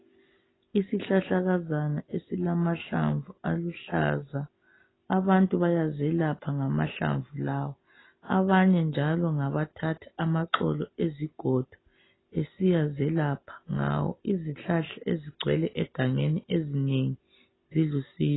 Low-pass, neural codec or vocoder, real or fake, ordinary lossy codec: 7.2 kHz; none; real; AAC, 16 kbps